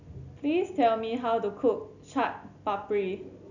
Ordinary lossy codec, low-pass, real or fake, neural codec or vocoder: none; 7.2 kHz; real; none